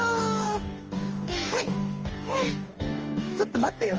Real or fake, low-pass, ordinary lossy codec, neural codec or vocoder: fake; 7.2 kHz; Opus, 24 kbps; codec, 44.1 kHz, 2.6 kbps, DAC